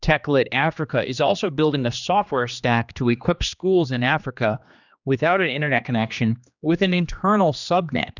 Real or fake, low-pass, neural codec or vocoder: fake; 7.2 kHz; codec, 16 kHz, 2 kbps, X-Codec, HuBERT features, trained on general audio